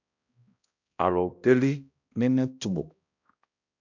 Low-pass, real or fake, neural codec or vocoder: 7.2 kHz; fake; codec, 16 kHz, 1 kbps, X-Codec, HuBERT features, trained on balanced general audio